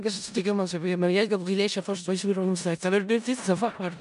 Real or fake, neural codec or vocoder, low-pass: fake; codec, 16 kHz in and 24 kHz out, 0.4 kbps, LongCat-Audio-Codec, four codebook decoder; 10.8 kHz